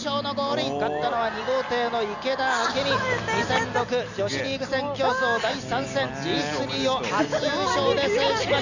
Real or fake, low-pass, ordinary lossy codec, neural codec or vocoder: real; 7.2 kHz; none; none